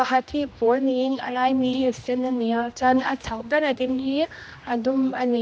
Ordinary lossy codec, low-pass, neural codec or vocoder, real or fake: none; none; codec, 16 kHz, 1 kbps, X-Codec, HuBERT features, trained on general audio; fake